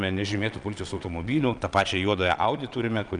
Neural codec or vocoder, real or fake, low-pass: vocoder, 22.05 kHz, 80 mel bands, Vocos; fake; 9.9 kHz